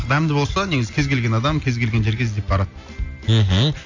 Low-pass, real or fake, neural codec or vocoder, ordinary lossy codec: 7.2 kHz; real; none; AAC, 48 kbps